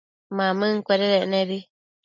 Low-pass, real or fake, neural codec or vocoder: 7.2 kHz; real; none